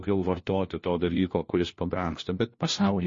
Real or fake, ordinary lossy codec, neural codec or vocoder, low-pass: fake; MP3, 32 kbps; codec, 16 kHz, 1 kbps, FunCodec, trained on LibriTTS, 50 frames a second; 7.2 kHz